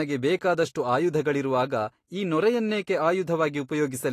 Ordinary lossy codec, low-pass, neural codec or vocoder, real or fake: AAC, 48 kbps; 14.4 kHz; none; real